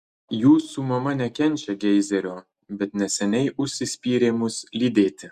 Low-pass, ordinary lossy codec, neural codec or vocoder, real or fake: 14.4 kHz; Opus, 64 kbps; none; real